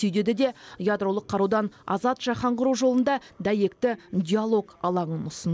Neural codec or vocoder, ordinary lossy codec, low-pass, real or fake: none; none; none; real